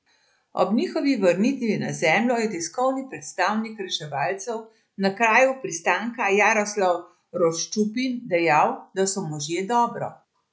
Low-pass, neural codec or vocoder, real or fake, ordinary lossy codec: none; none; real; none